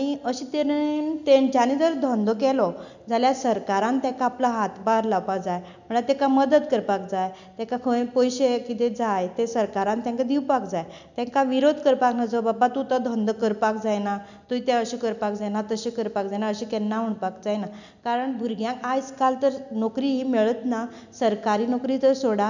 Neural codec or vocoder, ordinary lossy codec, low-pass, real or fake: none; none; 7.2 kHz; real